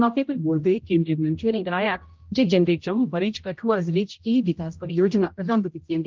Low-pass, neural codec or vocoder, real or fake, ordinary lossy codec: 7.2 kHz; codec, 16 kHz, 0.5 kbps, X-Codec, HuBERT features, trained on general audio; fake; Opus, 32 kbps